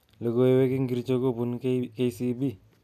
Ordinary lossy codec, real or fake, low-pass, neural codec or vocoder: none; real; 14.4 kHz; none